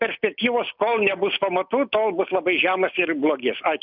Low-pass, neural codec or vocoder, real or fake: 5.4 kHz; none; real